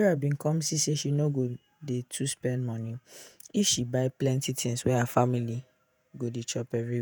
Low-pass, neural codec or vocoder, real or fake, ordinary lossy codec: none; vocoder, 48 kHz, 128 mel bands, Vocos; fake; none